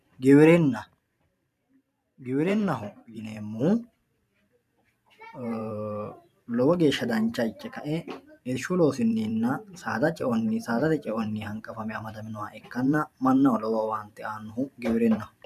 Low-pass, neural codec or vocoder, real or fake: 14.4 kHz; vocoder, 44.1 kHz, 128 mel bands every 512 samples, BigVGAN v2; fake